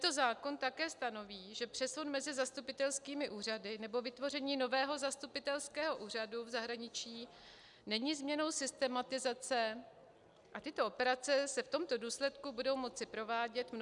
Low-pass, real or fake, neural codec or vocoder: 10.8 kHz; real; none